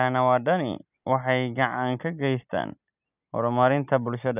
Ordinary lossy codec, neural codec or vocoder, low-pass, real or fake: none; none; 3.6 kHz; real